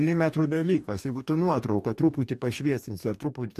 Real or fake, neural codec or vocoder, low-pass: fake; codec, 44.1 kHz, 2.6 kbps, DAC; 14.4 kHz